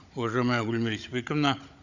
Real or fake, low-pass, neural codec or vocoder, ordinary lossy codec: fake; 7.2 kHz; codec, 16 kHz, 16 kbps, FunCodec, trained on Chinese and English, 50 frames a second; none